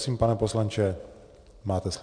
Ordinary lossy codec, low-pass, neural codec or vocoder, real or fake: MP3, 64 kbps; 9.9 kHz; none; real